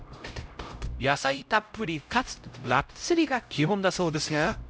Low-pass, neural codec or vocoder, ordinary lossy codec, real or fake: none; codec, 16 kHz, 0.5 kbps, X-Codec, HuBERT features, trained on LibriSpeech; none; fake